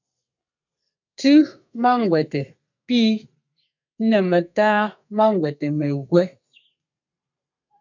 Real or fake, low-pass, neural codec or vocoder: fake; 7.2 kHz; codec, 32 kHz, 1.9 kbps, SNAC